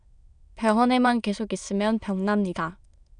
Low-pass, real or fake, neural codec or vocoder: 9.9 kHz; fake; autoencoder, 22.05 kHz, a latent of 192 numbers a frame, VITS, trained on many speakers